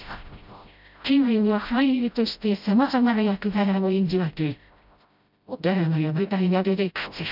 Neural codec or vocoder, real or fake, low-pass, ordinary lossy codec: codec, 16 kHz, 0.5 kbps, FreqCodec, smaller model; fake; 5.4 kHz; none